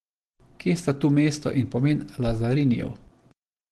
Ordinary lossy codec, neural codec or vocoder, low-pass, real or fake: Opus, 16 kbps; none; 10.8 kHz; real